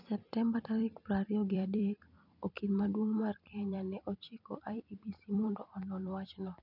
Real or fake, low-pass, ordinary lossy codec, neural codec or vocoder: fake; 5.4 kHz; none; vocoder, 44.1 kHz, 128 mel bands every 256 samples, BigVGAN v2